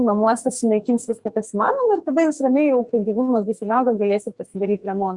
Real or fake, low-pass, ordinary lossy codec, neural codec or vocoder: fake; 10.8 kHz; Opus, 64 kbps; codec, 44.1 kHz, 2.6 kbps, SNAC